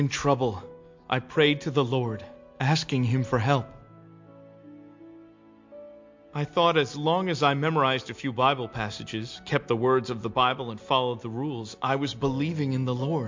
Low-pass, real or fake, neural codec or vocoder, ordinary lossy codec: 7.2 kHz; real; none; MP3, 48 kbps